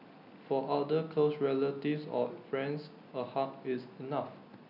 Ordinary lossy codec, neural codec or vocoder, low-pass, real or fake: none; none; 5.4 kHz; real